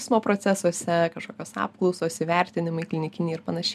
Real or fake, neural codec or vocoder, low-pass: real; none; 14.4 kHz